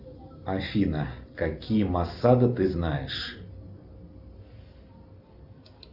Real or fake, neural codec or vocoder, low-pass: real; none; 5.4 kHz